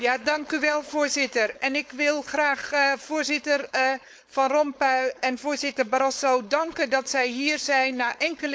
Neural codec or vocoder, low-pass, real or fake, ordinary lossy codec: codec, 16 kHz, 4.8 kbps, FACodec; none; fake; none